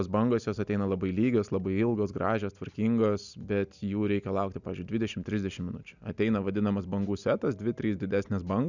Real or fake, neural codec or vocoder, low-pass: real; none; 7.2 kHz